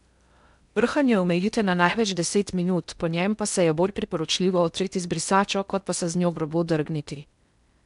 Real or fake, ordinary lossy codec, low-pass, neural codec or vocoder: fake; none; 10.8 kHz; codec, 16 kHz in and 24 kHz out, 0.8 kbps, FocalCodec, streaming, 65536 codes